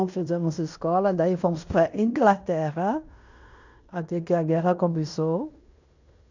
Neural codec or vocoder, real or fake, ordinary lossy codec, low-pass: codec, 16 kHz in and 24 kHz out, 0.9 kbps, LongCat-Audio-Codec, fine tuned four codebook decoder; fake; none; 7.2 kHz